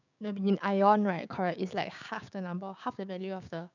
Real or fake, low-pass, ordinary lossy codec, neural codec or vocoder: fake; 7.2 kHz; none; codec, 16 kHz, 6 kbps, DAC